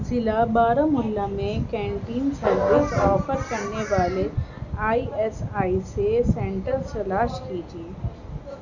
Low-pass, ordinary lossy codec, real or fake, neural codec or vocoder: 7.2 kHz; none; real; none